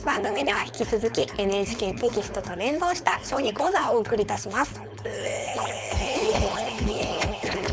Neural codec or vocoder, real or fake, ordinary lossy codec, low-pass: codec, 16 kHz, 4.8 kbps, FACodec; fake; none; none